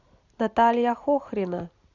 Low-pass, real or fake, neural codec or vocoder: 7.2 kHz; real; none